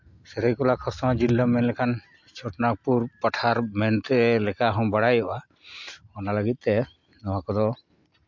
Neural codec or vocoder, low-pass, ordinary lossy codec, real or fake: none; 7.2 kHz; MP3, 48 kbps; real